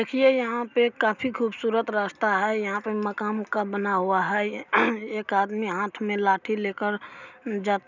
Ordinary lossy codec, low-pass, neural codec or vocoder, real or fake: none; 7.2 kHz; none; real